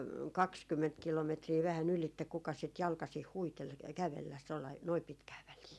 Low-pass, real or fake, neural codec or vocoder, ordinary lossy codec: none; real; none; none